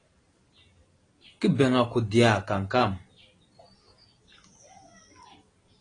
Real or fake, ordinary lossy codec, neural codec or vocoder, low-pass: real; AAC, 32 kbps; none; 9.9 kHz